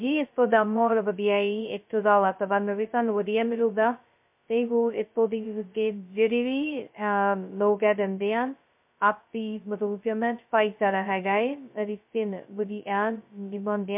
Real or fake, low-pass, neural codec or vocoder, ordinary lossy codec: fake; 3.6 kHz; codec, 16 kHz, 0.2 kbps, FocalCodec; MP3, 32 kbps